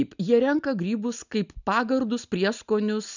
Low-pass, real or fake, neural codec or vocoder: 7.2 kHz; real; none